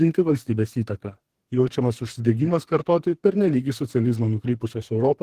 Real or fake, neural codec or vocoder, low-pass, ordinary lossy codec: fake; codec, 32 kHz, 1.9 kbps, SNAC; 14.4 kHz; Opus, 16 kbps